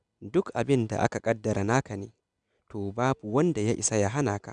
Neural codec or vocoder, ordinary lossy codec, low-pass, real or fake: none; none; 9.9 kHz; real